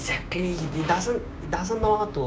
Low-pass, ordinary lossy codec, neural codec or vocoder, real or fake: none; none; codec, 16 kHz, 6 kbps, DAC; fake